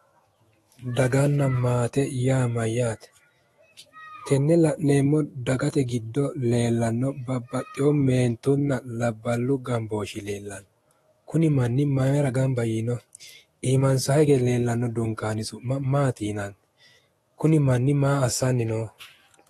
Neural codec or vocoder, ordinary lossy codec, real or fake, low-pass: autoencoder, 48 kHz, 128 numbers a frame, DAC-VAE, trained on Japanese speech; AAC, 32 kbps; fake; 19.8 kHz